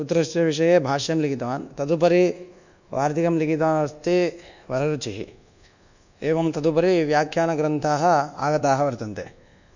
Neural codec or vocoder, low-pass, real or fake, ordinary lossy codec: codec, 24 kHz, 1.2 kbps, DualCodec; 7.2 kHz; fake; none